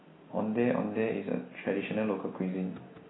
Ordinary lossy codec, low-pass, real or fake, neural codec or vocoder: AAC, 16 kbps; 7.2 kHz; real; none